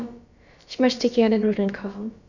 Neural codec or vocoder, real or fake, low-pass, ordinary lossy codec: codec, 16 kHz, about 1 kbps, DyCAST, with the encoder's durations; fake; 7.2 kHz; none